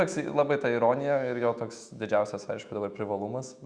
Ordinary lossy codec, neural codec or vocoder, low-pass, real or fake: Opus, 64 kbps; autoencoder, 48 kHz, 128 numbers a frame, DAC-VAE, trained on Japanese speech; 9.9 kHz; fake